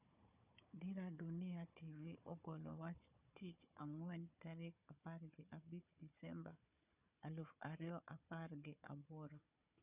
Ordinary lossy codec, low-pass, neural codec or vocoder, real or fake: none; 3.6 kHz; codec, 16 kHz, 4 kbps, FunCodec, trained on Chinese and English, 50 frames a second; fake